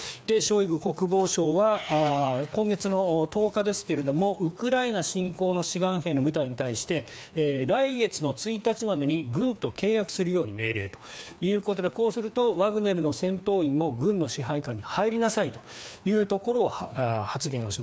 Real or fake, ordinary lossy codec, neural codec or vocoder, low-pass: fake; none; codec, 16 kHz, 2 kbps, FreqCodec, larger model; none